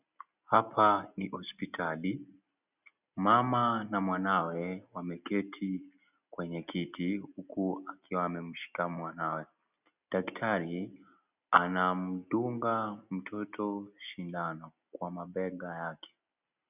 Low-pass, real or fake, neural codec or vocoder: 3.6 kHz; real; none